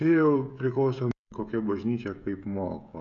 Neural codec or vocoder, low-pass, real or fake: codec, 16 kHz, 16 kbps, FreqCodec, smaller model; 7.2 kHz; fake